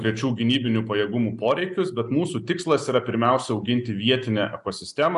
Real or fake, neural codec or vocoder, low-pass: real; none; 10.8 kHz